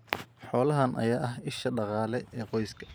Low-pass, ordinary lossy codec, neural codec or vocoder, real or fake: none; none; vocoder, 44.1 kHz, 128 mel bands every 512 samples, BigVGAN v2; fake